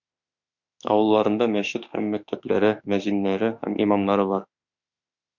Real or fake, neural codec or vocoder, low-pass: fake; autoencoder, 48 kHz, 32 numbers a frame, DAC-VAE, trained on Japanese speech; 7.2 kHz